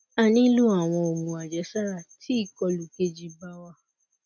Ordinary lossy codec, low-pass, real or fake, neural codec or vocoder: none; 7.2 kHz; real; none